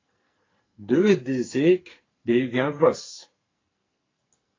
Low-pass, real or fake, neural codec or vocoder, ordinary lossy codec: 7.2 kHz; fake; codec, 44.1 kHz, 2.6 kbps, SNAC; AAC, 32 kbps